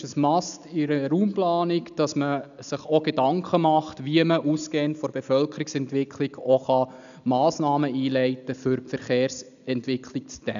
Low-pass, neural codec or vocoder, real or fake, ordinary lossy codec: 7.2 kHz; none; real; none